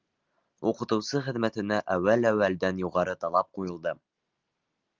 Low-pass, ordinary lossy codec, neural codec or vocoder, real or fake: 7.2 kHz; Opus, 32 kbps; none; real